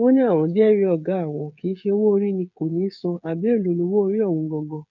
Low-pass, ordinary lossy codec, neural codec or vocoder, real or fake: 7.2 kHz; none; codec, 16 kHz, 8 kbps, FreqCodec, smaller model; fake